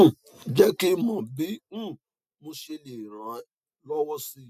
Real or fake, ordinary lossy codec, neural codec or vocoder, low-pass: real; none; none; 14.4 kHz